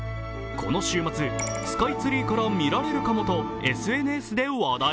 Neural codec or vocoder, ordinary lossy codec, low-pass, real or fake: none; none; none; real